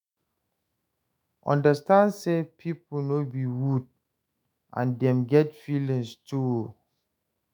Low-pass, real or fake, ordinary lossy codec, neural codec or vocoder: none; fake; none; autoencoder, 48 kHz, 128 numbers a frame, DAC-VAE, trained on Japanese speech